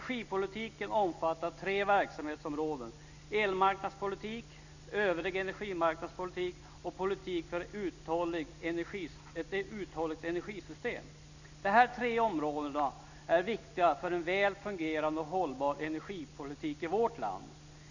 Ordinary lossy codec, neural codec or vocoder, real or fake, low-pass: none; none; real; 7.2 kHz